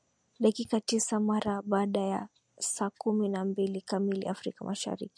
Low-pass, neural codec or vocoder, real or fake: 9.9 kHz; none; real